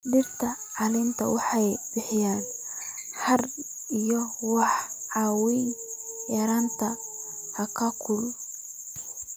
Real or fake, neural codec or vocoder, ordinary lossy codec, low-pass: real; none; none; none